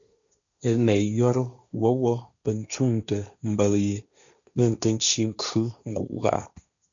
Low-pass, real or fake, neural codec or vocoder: 7.2 kHz; fake; codec, 16 kHz, 1.1 kbps, Voila-Tokenizer